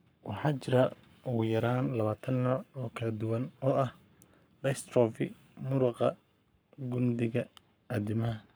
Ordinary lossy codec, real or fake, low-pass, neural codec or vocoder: none; fake; none; codec, 44.1 kHz, 7.8 kbps, Pupu-Codec